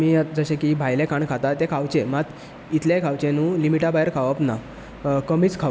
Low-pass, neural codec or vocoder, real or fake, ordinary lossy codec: none; none; real; none